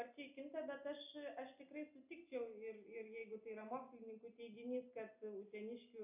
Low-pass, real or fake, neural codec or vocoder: 3.6 kHz; real; none